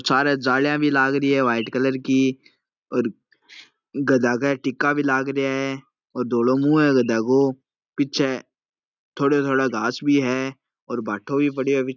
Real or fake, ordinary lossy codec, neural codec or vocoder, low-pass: real; none; none; 7.2 kHz